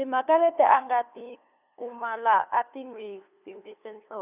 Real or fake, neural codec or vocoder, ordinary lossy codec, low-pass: fake; codec, 16 kHz, 2 kbps, FunCodec, trained on LibriTTS, 25 frames a second; none; 3.6 kHz